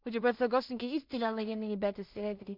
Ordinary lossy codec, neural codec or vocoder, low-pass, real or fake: MP3, 48 kbps; codec, 16 kHz in and 24 kHz out, 0.4 kbps, LongCat-Audio-Codec, two codebook decoder; 5.4 kHz; fake